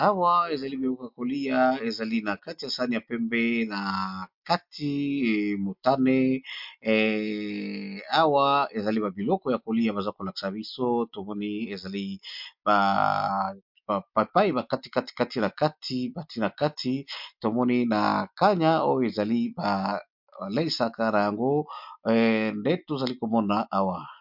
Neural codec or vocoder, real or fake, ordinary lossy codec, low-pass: none; real; MP3, 48 kbps; 5.4 kHz